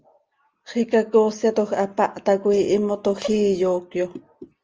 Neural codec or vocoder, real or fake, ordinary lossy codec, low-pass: none; real; Opus, 24 kbps; 7.2 kHz